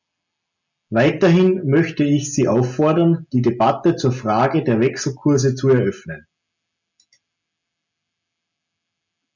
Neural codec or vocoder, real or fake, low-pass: none; real; 7.2 kHz